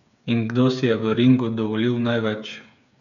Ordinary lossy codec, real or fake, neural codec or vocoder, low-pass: none; fake; codec, 16 kHz, 8 kbps, FreqCodec, smaller model; 7.2 kHz